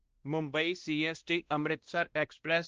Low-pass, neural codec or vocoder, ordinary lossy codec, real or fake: 7.2 kHz; codec, 16 kHz, 1 kbps, X-Codec, WavLM features, trained on Multilingual LibriSpeech; Opus, 16 kbps; fake